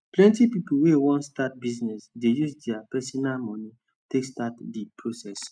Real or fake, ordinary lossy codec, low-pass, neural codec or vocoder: real; none; 9.9 kHz; none